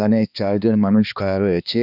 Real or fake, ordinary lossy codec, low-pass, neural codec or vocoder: fake; none; 5.4 kHz; codec, 16 kHz, 2 kbps, X-Codec, HuBERT features, trained on balanced general audio